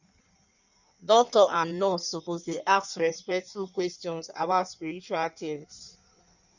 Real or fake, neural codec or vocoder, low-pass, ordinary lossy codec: fake; codec, 16 kHz in and 24 kHz out, 1.1 kbps, FireRedTTS-2 codec; 7.2 kHz; none